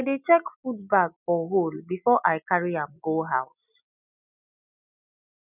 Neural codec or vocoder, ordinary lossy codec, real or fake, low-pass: none; none; real; 3.6 kHz